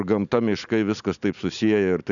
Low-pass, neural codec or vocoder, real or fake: 7.2 kHz; none; real